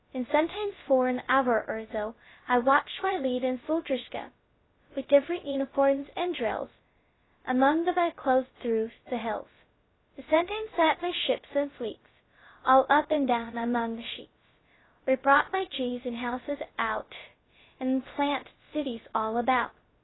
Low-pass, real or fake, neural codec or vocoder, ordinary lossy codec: 7.2 kHz; fake; codec, 16 kHz, 0.2 kbps, FocalCodec; AAC, 16 kbps